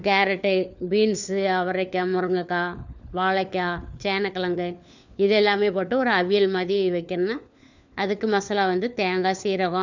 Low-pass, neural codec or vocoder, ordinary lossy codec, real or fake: 7.2 kHz; codec, 16 kHz, 4 kbps, FunCodec, trained on LibriTTS, 50 frames a second; none; fake